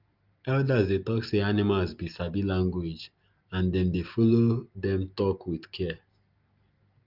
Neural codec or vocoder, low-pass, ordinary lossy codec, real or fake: none; 5.4 kHz; Opus, 24 kbps; real